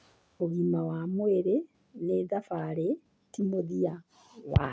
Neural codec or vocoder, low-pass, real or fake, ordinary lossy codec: none; none; real; none